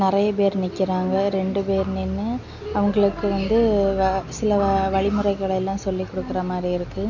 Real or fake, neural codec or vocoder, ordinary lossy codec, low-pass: real; none; none; 7.2 kHz